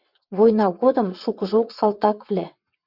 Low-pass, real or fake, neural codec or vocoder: 5.4 kHz; fake; vocoder, 22.05 kHz, 80 mel bands, WaveNeXt